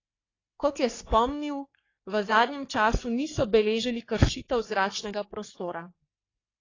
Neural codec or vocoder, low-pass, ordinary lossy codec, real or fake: codec, 44.1 kHz, 3.4 kbps, Pupu-Codec; 7.2 kHz; AAC, 32 kbps; fake